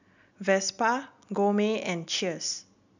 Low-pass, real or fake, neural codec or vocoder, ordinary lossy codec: 7.2 kHz; real; none; none